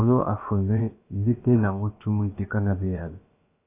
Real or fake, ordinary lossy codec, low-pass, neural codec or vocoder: fake; AAC, 24 kbps; 3.6 kHz; codec, 16 kHz, about 1 kbps, DyCAST, with the encoder's durations